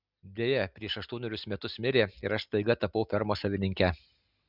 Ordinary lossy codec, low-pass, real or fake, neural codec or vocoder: Opus, 64 kbps; 5.4 kHz; real; none